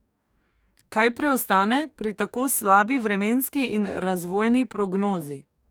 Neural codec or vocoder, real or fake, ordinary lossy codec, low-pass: codec, 44.1 kHz, 2.6 kbps, DAC; fake; none; none